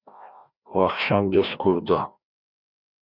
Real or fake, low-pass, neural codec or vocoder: fake; 5.4 kHz; codec, 16 kHz, 1 kbps, FreqCodec, larger model